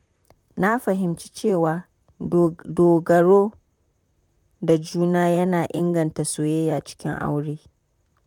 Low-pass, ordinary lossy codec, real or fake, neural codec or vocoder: 19.8 kHz; none; fake; vocoder, 44.1 kHz, 128 mel bands, Pupu-Vocoder